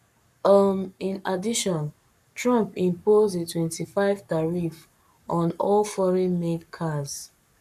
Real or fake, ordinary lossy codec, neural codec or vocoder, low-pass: fake; none; codec, 44.1 kHz, 7.8 kbps, Pupu-Codec; 14.4 kHz